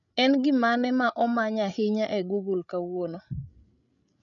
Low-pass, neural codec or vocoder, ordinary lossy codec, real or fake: 7.2 kHz; codec, 16 kHz, 16 kbps, FreqCodec, larger model; none; fake